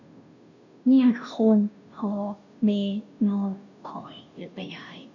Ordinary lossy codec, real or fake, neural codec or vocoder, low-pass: none; fake; codec, 16 kHz, 0.5 kbps, FunCodec, trained on Chinese and English, 25 frames a second; 7.2 kHz